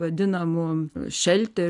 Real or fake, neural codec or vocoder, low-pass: real; none; 10.8 kHz